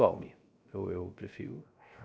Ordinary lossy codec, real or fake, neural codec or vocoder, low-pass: none; fake; codec, 16 kHz, 0.3 kbps, FocalCodec; none